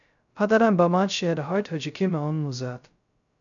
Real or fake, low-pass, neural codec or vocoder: fake; 7.2 kHz; codec, 16 kHz, 0.2 kbps, FocalCodec